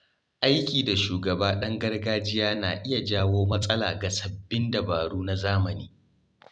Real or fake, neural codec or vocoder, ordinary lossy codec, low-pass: fake; vocoder, 44.1 kHz, 128 mel bands every 256 samples, BigVGAN v2; none; 9.9 kHz